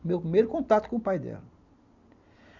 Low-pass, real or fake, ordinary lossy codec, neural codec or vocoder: 7.2 kHz; real; none; none